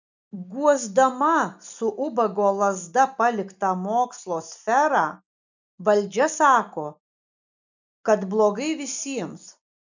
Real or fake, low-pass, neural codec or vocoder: real; 7.2 kHz; none